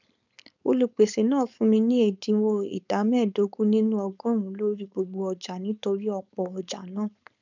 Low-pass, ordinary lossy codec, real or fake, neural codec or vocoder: 7.2 kHz; none; fake; codec, 16 kHz, 4.8 kbps, FACodec